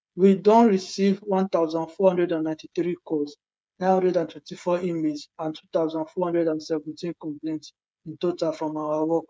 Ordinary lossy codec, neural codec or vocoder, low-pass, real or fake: none; codec, 16 kHz, 8 kbps, FreqCodec, smaller model; none; fake